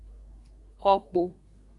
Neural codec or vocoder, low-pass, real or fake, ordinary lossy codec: codec, 24 kHz, 1 kbps, SNAC; 10.8 kHz; fake; MP3, 96 kbps